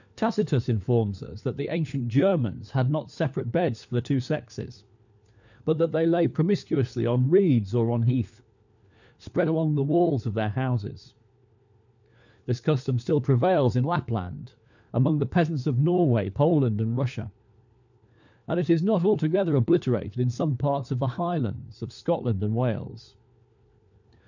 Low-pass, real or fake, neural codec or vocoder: 7.2 kHz; fake; codec, 16 kHz, 4 kbps, FunCodec, trained on LibriTTS, 50 frames a second